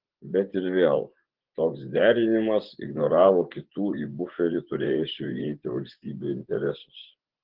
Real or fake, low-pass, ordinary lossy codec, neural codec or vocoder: fake; 5.4 kHz; Opus, 16 kbps; vocoder, 24 kHz, 100 mel bands, Vocos